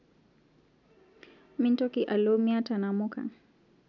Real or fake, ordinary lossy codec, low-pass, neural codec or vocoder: real; none; 7.2 kHz; none